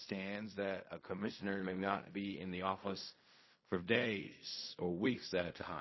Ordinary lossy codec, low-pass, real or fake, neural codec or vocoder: MP3, 24 kbps; 7.2 kHz; fake; codec, 16 kHz in and 24 kHz out, 0.4 kbps, LongCat-Audio-Codec, fine tuned four codebook decoder